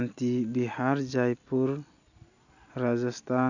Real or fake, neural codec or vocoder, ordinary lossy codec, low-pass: real; none; none; 7.2 kHz